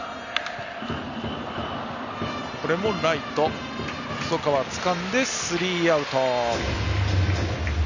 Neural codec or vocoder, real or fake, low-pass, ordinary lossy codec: none; real; 7.2 kHz; none